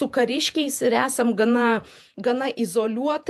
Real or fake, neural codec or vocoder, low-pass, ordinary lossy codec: fake; vocoder, 48 kHz, 128 mel bands, Vocos; 14.4 kHz; AAC, 96 kbps